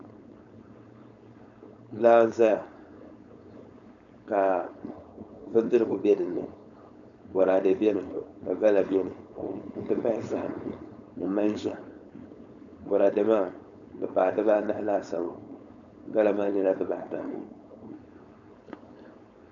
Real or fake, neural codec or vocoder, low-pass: fake; codec, 16 kHz, 4.8 kbps, FACodec; 7.2 kHz